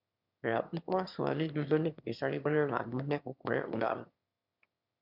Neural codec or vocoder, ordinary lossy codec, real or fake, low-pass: autoencoder, 22.05 kHz, a latent of 192 numbers a frame, VITS, trained on one speaker; MP3, 48 kbps; fake; 5.4 kHz